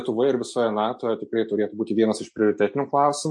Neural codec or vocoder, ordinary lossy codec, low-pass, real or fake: none; MP3, 48 kbps; 10.8 kHz; real